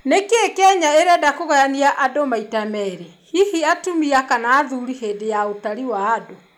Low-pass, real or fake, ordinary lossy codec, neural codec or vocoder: none; real; none; none